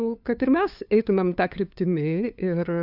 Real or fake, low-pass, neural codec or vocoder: fake; 5.4 kHz; codec, 16 kHz, 2 kbps, FunCodec, trained on LibriTTS, 25 frames a second